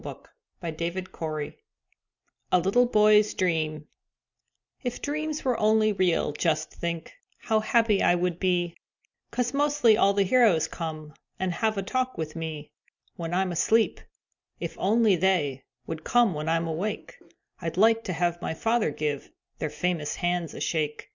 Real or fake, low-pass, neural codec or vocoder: real; 7.2 kHz; none